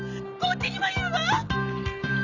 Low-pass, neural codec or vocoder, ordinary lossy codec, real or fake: 7.2 kHz; vocoder, 44.1 kHz, 128 mel bands every 512 samples, BigVGAN v2; none; fake